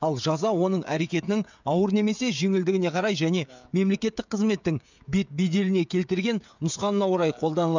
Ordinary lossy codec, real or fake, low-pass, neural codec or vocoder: none; fake; 7.2 kHz; codec, 16 kHz, 16 kbps, FreqCodec, smaller model